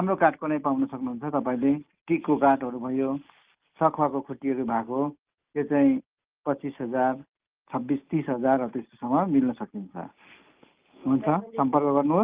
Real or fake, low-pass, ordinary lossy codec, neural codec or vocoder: real; 3.6 kHz; Opus, 32 kbps; none